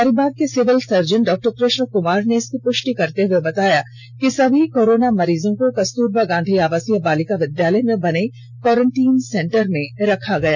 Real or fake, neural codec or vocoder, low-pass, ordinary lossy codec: real; none; 7.2 kHz; none